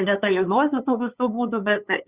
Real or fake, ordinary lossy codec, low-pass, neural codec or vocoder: fake; Opus, 64 kbps; 3.6 kHz; codec, 16 kHz, 2 kbps, FunCodec, trained on LibriTTS, 25 frames a second